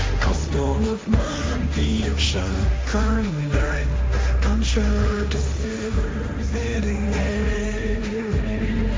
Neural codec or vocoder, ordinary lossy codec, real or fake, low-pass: codec, 16 kHz, 1.1 kbps, Voila-Tokenizer; none; fake; none